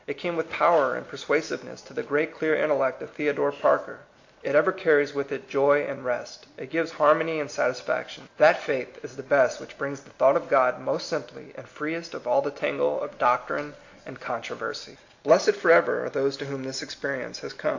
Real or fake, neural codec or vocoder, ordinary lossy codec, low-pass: real; none; AAC, 48 kbps; 7.2 kHz